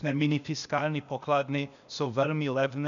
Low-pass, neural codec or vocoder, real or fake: 7.2 kHz; codec, 16 kHz, 0.8 kbps, ZipCodec; fake